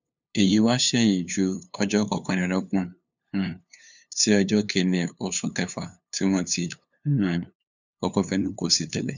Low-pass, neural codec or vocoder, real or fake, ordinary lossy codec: 7.2 kHz; codec, 16 kHz, 2 kbps, FunCodec, trained on LibriTTS, 25 frames a second; fake; none